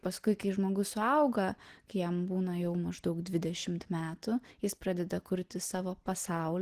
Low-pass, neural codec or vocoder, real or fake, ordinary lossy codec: 14.4 kHz; none; real; Opus, 16 kbps